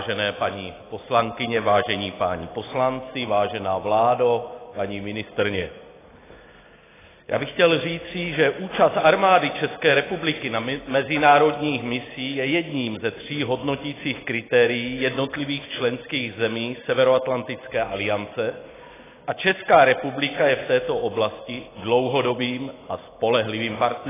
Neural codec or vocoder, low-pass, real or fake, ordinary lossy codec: none; 3.6 kHz; real; AAC, 16 kbps